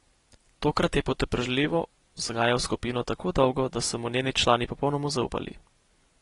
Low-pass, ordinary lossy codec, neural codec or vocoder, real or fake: 10.8 kHz; AAC, 32 kbps; none; real